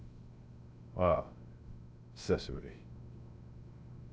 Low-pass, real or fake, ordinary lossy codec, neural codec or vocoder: none; fake; none; codec, 16 kHz, 0.3 kbps, FocalCodec